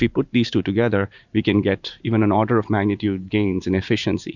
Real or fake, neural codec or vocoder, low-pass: fake; vocoder, 44.1 kHz, 128 mel bands every 512 samples, BigVGAN v2; 7.2 kHz